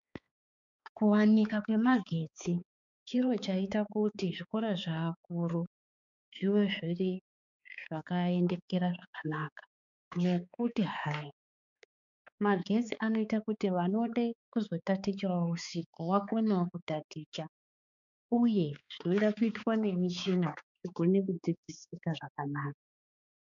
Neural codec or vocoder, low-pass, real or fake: codec, 16 kHz, 4 kbps, X-Codec, HuBERT features, trained on balanced general audio; 7.2 kHz; fake